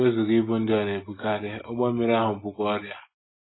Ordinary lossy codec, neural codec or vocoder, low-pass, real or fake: AAC, 16 kbps; none; 7.2 kHz; real